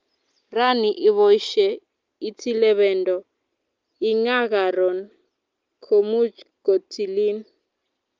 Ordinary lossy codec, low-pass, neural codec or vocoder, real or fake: Opus, 24 kbps; 7.2 kHz; none; real